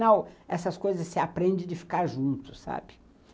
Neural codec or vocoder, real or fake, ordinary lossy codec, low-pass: none; real; none; none